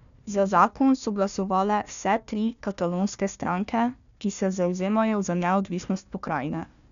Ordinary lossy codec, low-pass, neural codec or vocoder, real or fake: none; 7.2 kHz; codec, 16 kHz, 1 kbps, FunCodec, trained on Chinese and English, 50 frames a second; fake